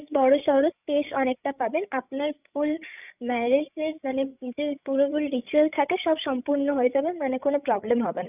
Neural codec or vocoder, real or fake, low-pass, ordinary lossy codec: codec, 16 kHz, 16 kbps, FreqCodec, larger model; fake; 3.6 kHz; none